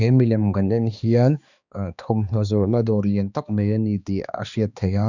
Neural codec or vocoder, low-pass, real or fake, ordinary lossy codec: codec, 16 kHz, 2 kbps, X-Codec, HuBERT features, trained on balanced general audio; 7.2 kHz; fake; none